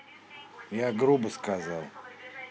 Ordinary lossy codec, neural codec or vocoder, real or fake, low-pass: none; none; real; none